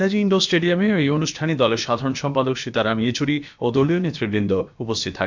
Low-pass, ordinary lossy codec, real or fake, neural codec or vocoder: 7.2 kHz; MP3, 64 kbps; fake; codec, 16 kHz, about 1 kbps, DyCAST, with the encoder's durations